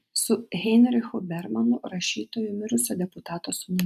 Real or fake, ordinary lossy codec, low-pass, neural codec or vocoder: fake; AAC, 96 kbps; 14.4 kHz; vocoder, 44.1 kHz, 128 mel bands every 256 samples, BigVGAN v2